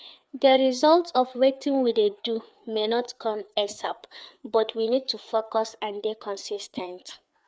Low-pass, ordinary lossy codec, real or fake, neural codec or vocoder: none; none; fake; codec, 16 kHz, 4 kbps, FreqCodec, larger model